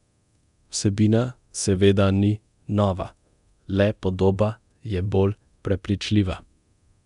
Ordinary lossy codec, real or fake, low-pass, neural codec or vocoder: none; fake; 10.8 kHz; codec, 24 kHz, 0.9 kbps, DualCodec